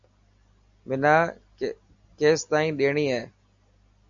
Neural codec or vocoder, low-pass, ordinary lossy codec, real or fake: none; 7.2 kHz; AAC, 64 kbps; real